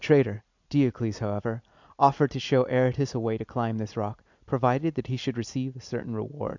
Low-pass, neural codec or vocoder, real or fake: 7.2 kHz; none; real